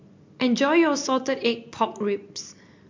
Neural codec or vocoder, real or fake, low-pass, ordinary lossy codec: none; real; 7.2 kHz; MP3, 48 kbps